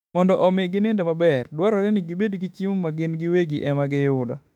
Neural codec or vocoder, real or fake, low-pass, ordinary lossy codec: autoencoder, 48 kHz, 32 numbers a frame, DAC-VAE, trained on Japanese speech; fake; 19.8 kHz; none